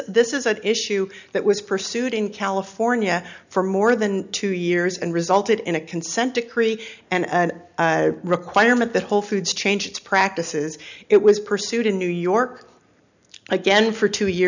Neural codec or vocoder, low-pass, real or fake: none; 7.2 kHz; real